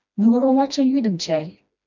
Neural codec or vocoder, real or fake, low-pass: codec, 16 kHz, 1 kbps, FreqCodec, smaller model; fake; 7.2 kHz